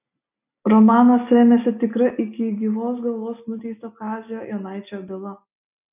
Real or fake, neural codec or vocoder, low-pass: real; none; 3.6 kHz